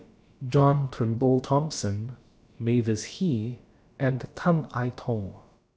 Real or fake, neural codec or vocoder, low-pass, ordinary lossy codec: fake; codec, 16 kHz, about 1 kbps, DyCAST, with the encoder's durations; none; none